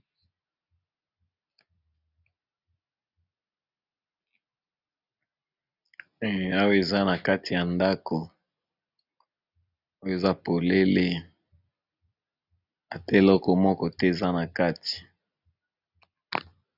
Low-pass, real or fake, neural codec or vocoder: 5.4 kHz; real; none